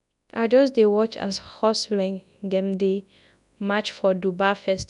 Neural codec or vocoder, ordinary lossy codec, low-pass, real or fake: codec, 24 kHz, 0.9 kbps, WavTokenizer, large speech release; none; 10.8 kHz; fake